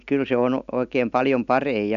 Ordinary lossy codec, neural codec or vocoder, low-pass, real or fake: none; none; 7.2 kHz; real